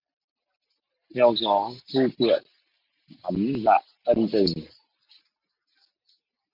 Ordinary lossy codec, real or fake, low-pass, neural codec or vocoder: MP3, 48 kbps; real; 5.4 kHz; none